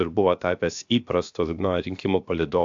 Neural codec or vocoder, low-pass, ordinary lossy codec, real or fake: codec, 16 kHz, about 1 kbps, DyCAST, with the encoder's durations; 7.2 kHz; AAC, 64 kbps; fake